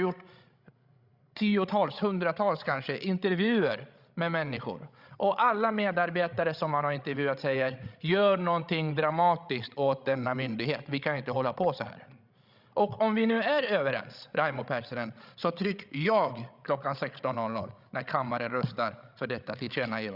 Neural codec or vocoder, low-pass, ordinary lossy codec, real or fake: codec, 16 kHz, 16 kbps, FreqCodec, larger model; 5.4 kHz; Opus, 64 kbps; fake